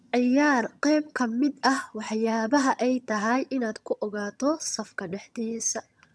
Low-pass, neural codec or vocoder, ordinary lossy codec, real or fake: none; vocoder, 22.05 kHz, 80 mel bands, HiFi-GAN; none; fake